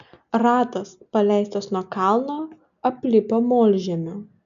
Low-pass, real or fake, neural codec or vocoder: 7.2 kHz; real; none